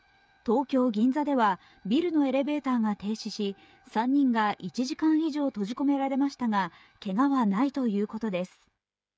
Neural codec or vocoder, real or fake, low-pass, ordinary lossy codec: codec, 16 kHz, 8 kbps, FreqCodec, smaller model; fake; none; none